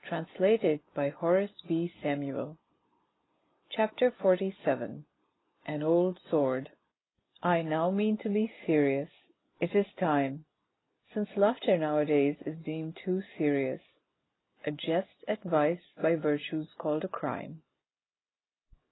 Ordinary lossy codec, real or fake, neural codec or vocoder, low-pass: AAC, 16 kbps; real; none; 7.2 kHz